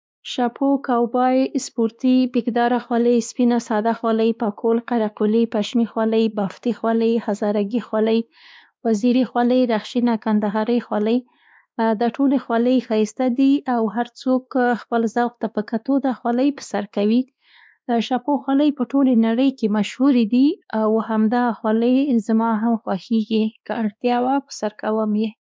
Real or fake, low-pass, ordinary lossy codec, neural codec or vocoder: fake; none; none; codec, 16 kHz, 2 kbps, X-Codec, WavLM features, trained on Multilingual LibriSpeech